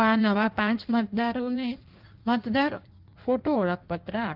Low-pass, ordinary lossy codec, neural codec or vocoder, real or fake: 5.4 kHz; Opus, 24 kbps; codec, 16 kHz in and 24 kHz out, 1.1 kbps, FireRedTTS-2 codec; fake